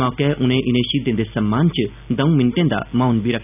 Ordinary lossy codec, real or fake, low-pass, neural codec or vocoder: none; real; 3.6 kHz; none